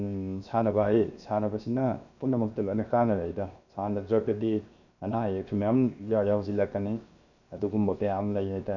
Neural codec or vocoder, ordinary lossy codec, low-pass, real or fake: codec, 16 kHz, 0.7 kbps, FocalCodec; none; 7.2 kHz; fake